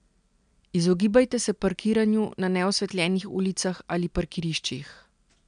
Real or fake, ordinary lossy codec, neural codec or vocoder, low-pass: real; none; none; 9.9 kHz